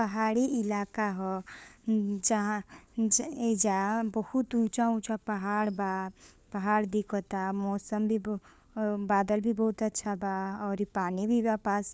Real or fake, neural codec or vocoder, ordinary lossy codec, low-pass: fake; codec, 16 kHz, 4 kbps, FunCodec, trained on LibriTTS, 50 frames a second; none; none